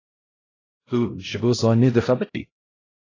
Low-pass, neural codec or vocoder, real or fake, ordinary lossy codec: 7.2 kHz; codec, 16 kHz, 0.5 kbps, X-Codec, HuBERT features, trained on LibriSpeech; fake; AAC, 32 kbps